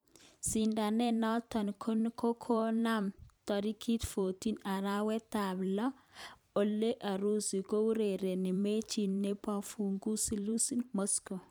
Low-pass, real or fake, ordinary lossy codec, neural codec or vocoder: none; fake; none; vocoder, 44.1 kHz, 128 mel bands every 512 samples, BigVGAN v2